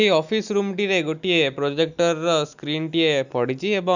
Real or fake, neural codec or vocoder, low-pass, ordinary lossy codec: real; none; 7.2 kHz; none